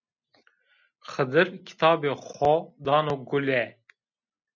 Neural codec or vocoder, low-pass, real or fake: none; 7.2 kHz; real